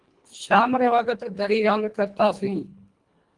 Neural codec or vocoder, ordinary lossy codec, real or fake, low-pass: codec, 24 kHz, 1.5 kbps, HILCodec; Opus, 24 kbps; fake; 10.8 kHz